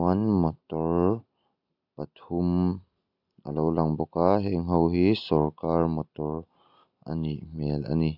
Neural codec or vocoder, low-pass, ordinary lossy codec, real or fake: none; 5.4 kHz; AAC, 48 kbps; real